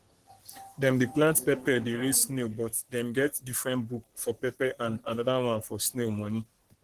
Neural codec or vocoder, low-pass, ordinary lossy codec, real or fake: codec, 44.1 kHz, 3.4 kbps, Pupu-Codec; 14.4 kHz; Opus, 16 kbps; fake